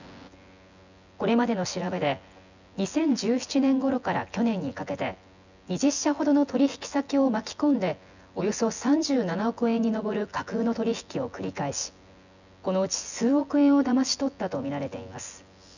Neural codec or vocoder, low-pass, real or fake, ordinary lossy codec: vocoder, 24 kHz, 100 mel bands, Vocos; 7.2 kHz; fake; none